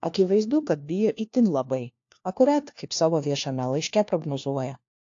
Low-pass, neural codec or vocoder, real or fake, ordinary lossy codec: 7.2 kHz; codec, 16 kHz, 1 kbps, FunCodec, trained on LibriTTS, 50 frames a second; fake; AAC, 48 kbps